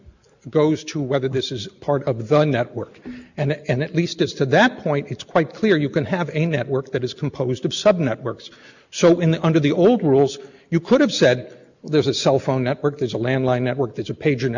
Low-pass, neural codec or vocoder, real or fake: 7.2 kHz; none; real